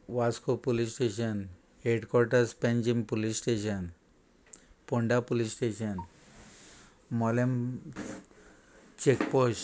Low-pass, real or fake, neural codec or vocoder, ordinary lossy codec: none; real; none; none